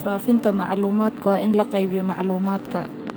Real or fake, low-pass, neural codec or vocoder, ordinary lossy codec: fake; none; codec, 44.1 kHz, 2.6 kbps, SNAC; none